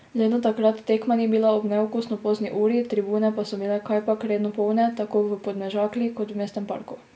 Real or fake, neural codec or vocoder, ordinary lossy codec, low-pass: real; none; none; none